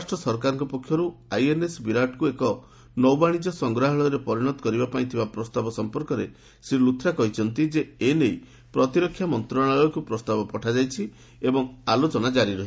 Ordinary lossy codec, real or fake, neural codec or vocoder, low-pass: none; real; none; none